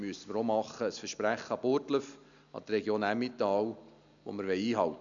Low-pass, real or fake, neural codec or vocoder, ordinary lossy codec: 7.2 kHz; real; none; none